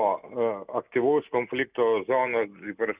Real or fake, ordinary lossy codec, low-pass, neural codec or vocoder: real; Opus, 64 kbps; 3.6 kHz; none